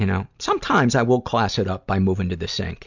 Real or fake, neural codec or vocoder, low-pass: real; none; 7.2 kHz